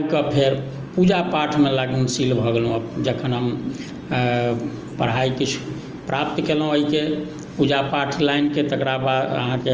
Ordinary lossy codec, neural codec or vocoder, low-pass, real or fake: Opus, 24 kbps; none; 7.2 kHz; real